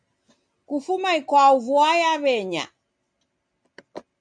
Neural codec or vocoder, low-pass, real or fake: none; 9.9 kHz; real